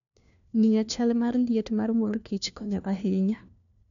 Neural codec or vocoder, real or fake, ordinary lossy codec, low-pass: codec, 16 kHz, 1 kbps, FunCodec, trained on LibriTTS, 50 frames a second; fake; none; 7.2 kHz